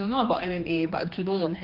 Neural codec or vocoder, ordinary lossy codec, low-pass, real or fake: codec, 16 kHz, 2 kbps, X-Codec, HuBERT features, trained on general audio; Opus, 24 kbps; 5.4 kHz; fake